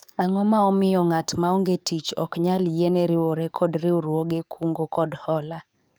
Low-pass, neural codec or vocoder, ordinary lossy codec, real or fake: none; codec, 44.1 kHz, 7.8 kbps, DAC; none; fake